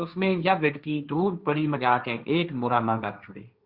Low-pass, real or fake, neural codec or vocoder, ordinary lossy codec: 5.4 kHz; fake; codec, 16 kHz, 1.1 kbps, Voila-Tokenizer; Opus, 64 kbps